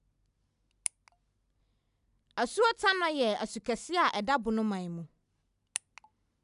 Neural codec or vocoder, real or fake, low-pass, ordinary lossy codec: none; real; 10.8 kHz; none